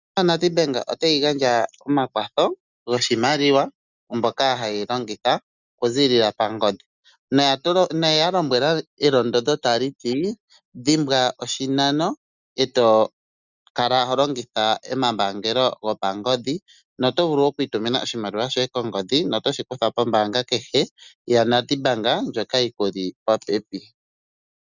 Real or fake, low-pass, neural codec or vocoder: real; 7.2 kHz; none